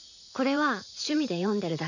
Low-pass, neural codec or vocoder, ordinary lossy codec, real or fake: 7.2 kHz; vocoder, 44.1 kHz, 80 mel bands, Vocos; none; fake